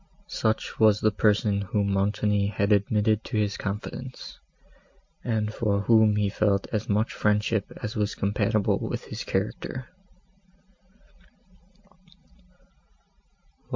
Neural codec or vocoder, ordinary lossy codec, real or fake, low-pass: none; MP3, 48 kbps; real; 7.2 kHz